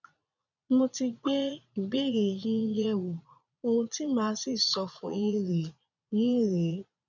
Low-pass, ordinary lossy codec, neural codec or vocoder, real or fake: 7.2 kHz; none; vocoder, 22.05 kHz, 80 mel bands, Vocos; fake